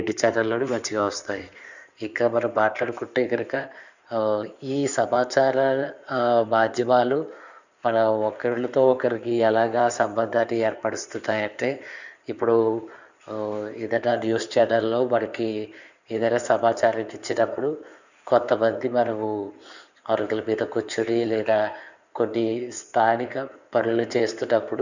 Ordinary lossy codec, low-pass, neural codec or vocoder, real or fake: none; 7.2 kHz; codec, 16 kHz in and 24 kHz out, 2.2 kbps, FireRedTTS-2 codec; fake